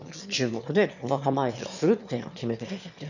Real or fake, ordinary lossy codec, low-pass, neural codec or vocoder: fake; none; 7.2 kHz; autoencoder, 22.05 kHz, a latent of 192 numbers a frame, VITS, trained on one speaker